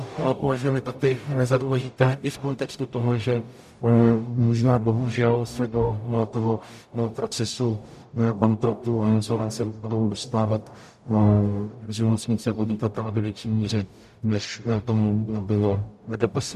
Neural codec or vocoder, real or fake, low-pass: codec, 44.1 kHz, 0.9 kbps, DAC; fake; 14.4 kHz